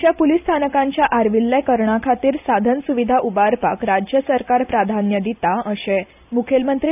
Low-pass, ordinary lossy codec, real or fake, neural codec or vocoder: 3.6 kHz; none; real; none